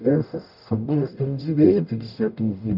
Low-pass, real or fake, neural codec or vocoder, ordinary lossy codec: 5.4 kHz; fake; codec, 44.1 kHz, 0.9 kbps, DAC; none